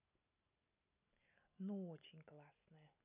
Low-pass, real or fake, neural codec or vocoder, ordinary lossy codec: 3.6 kHz; real; none; none